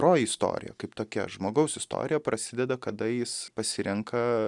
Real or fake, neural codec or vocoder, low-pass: real; none; 10.8 kHz